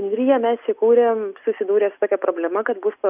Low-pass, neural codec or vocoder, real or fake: 3.6 kHz; none; real